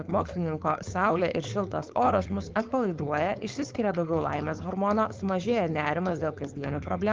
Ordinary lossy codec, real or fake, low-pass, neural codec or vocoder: Opus, 32 kbps; fake; 7.2 kHz; codec, 16 kHz, 4.8 kbps, FACodec